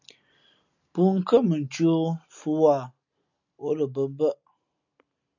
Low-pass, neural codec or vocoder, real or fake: 7.2 kHz; none; real